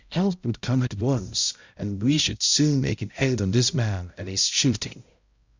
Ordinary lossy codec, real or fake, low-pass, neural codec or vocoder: Opus, 64 kbps; fake; 7.2 kHz; codec, 16 kHz, 0.5 kbps, X-Codec, HuBERT features, trained on balanced general audio